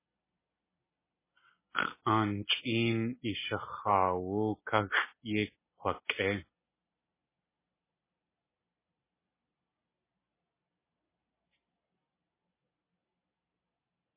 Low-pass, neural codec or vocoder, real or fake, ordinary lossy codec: 3.6 kHz; none; real; MP3, 16 kbps